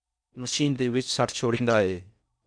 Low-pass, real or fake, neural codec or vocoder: 9.9 kHz; fake; codec, 16 kHz in and 24 kHz out, 0.6 kbps, FocalCodec, streaming, 4096 codes